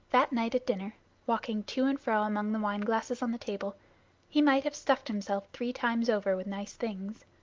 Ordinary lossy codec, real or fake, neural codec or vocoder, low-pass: Opus, 32 kbps; real; none; 7.2 kHz